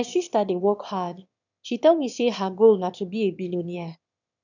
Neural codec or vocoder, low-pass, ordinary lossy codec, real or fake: autoencoder, 22.05 kHz, a latent of 192 numbers a frame, VITS, trained on one speaker; 7.2 kHz; none; fake